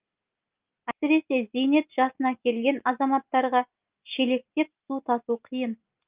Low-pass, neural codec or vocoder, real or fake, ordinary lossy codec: 3.6 kHz; none; real; Opus, 24 kbps